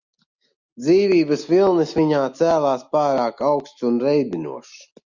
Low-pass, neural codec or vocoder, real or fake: 7.2 kHz; none; real